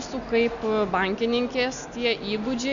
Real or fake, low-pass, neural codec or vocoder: real; 7.2 kHz; none